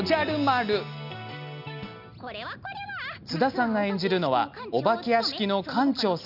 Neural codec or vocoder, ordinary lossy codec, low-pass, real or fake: none; none; 5.4 kHz; real